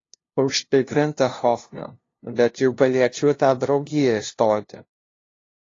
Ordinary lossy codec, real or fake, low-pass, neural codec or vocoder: AAC, 32 kbps; fake; 7.2 kHz; codec, 16 kHz, 0.5 kbps, FunCodec, trained on LibriTTS, 25 frames a second